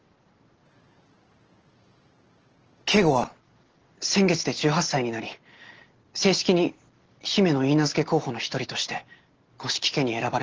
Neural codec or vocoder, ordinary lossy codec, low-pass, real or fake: none; Opus, 16 kbps; 7.2 kHz; real